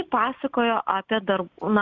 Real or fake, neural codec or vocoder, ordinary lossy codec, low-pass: real; none; Opus, 64 kbps; 7.2 kHz